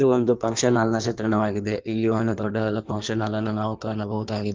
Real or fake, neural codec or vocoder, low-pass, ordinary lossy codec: fake; codec, 16 kHz in and 24 kHz out, 1.1 kbps, FireRedTTS-2 codec; 7.2 kHz; Opus, 32 kbps